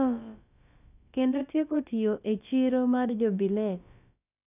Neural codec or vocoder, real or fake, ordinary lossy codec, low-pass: codec, 16 kHz, about 1 kbps, DyCAST, with the encoder's durations; fake; none; 3.6 kHz